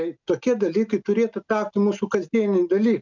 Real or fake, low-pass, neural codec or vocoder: real; 7.2 kHz; none